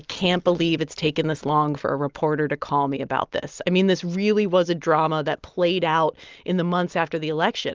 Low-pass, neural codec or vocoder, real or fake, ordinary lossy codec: 7.2 kHz; none; real; Opus, 24 kbps